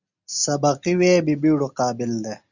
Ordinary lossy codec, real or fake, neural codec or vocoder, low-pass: Opus, 64 kbps; real; none; 7.2 kHz